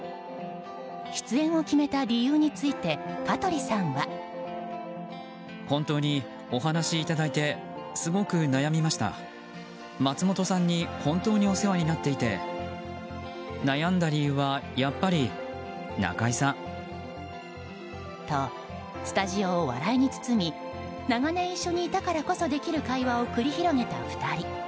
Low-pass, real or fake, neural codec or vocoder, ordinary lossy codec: none; real; none; none